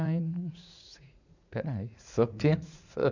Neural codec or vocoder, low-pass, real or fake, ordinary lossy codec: vocoder, 44.1 kHz, 80 mel bands, Vocos; 7.2 kHz; fake; none